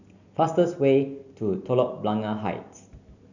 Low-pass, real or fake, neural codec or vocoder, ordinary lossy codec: 7.2 kHz; real; none; none